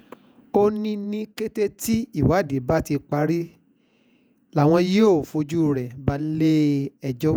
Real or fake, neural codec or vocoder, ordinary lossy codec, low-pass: fake; vocoder, 48 kHz, 128 mel bands, Vocos; none; none